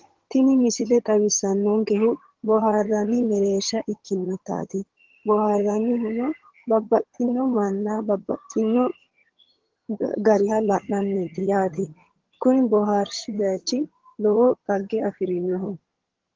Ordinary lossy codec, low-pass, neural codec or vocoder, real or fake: Opus, 16 kbps; 7.2 kHz; vocoder, 22.05 kHz, 80 mel bands, HiFi-GAN; fake